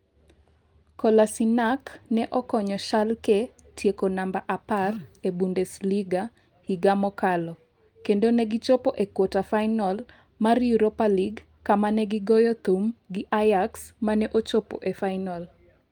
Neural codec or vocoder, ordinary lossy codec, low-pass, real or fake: none; Opus, 32 kbps; 19.8 kHz; real